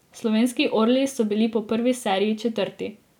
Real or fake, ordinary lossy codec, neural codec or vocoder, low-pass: real; none; none; 19.8 kHz